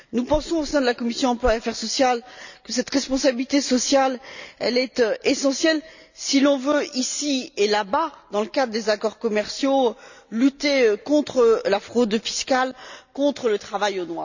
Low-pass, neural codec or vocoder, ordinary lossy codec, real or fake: 7.2 kHz; none; none; real